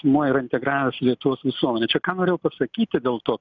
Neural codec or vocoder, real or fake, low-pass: none; real; 7.2 kHz